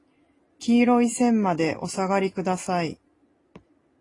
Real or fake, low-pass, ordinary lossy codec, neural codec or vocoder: real; 10.8 kHz; AAC, 32 kbps; none